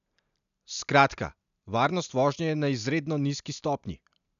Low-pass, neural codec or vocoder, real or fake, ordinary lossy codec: 7.2 kHz; none; real; none